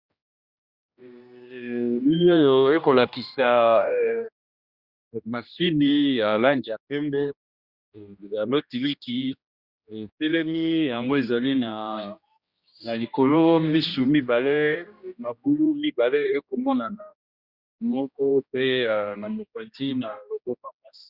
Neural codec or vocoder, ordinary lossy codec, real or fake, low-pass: codec, 16 kHz, 1 kbps, X-Codec, HuBERT features, trained on general audio; Opus, 64 kbps; fake; 5.4 kHz